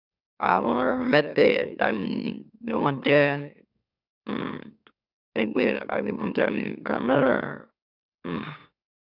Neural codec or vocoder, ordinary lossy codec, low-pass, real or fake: autoencoder, 44.1 kHz, a latent of 192 numbers a frame, MeloTTS; none; 5.4 kHz; fake